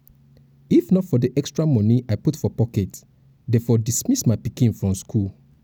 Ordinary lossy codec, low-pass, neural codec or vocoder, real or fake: none; none; none; real